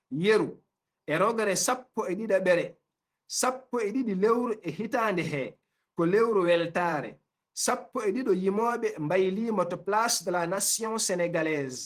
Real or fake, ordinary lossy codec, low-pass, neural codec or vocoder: fake; Opus, 24 kbps; 14.4 kHz; vocoder, 48 kHz, 128 mel bands, Vocos